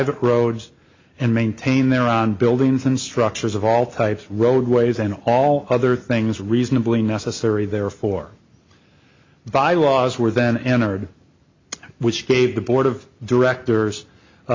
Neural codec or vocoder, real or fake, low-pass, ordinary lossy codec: none; real; 7.2 kHz; MP3, 48 kbps